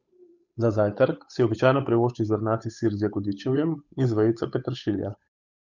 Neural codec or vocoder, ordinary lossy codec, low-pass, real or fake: codec, 16 kHz, 8 kbps, FunCodec, trained on Chinese and English, 25 frames a second; none; 7.2 kHz; fake